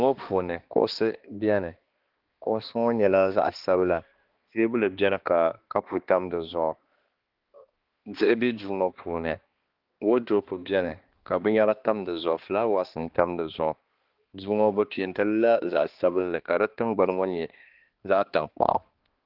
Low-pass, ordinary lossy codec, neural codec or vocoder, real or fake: 5.4 kHz; Opus, 16 kbps; codec, 16 kHz, 2 kbps, X-Codec, HuBERT features, trained on balanced general audio; fake